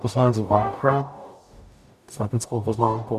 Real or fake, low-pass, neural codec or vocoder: fake; 14.4 kHz; codec, 44.1 kHz, 0.9 kbps, DAC